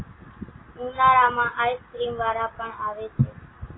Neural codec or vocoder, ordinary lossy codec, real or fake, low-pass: none; AAC, 16 kbps; real; 7.2 kHz